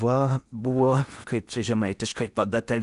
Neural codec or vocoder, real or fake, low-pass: codec, 16 kHz in and 24 kHz out, 0.8 kbps, FocalCodec, streaming, 65536 codes; fake; 10.8 kHz